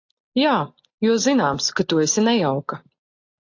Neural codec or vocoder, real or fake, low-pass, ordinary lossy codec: none; real; 7.2 kHz; AAC, 48 kbps